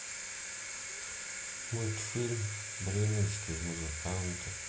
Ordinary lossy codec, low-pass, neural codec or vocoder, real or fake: none; none; none; real